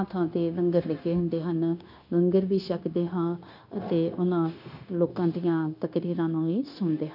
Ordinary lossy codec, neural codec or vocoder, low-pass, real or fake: none; codec, 16 kHz, 0.9 kbps, LongCat-Audio-Codec; 5.4 kHz; fake